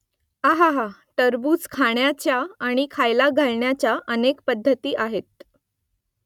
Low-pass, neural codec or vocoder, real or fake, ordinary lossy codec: 19.8 kHz; none; real; none